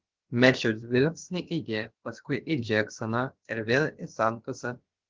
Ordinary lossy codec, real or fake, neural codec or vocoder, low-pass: Opus, 16 kbps; fake; codec, 16 kHz, about 1 kbps, DyCAST, with the encoder's durations; 7.2 kHz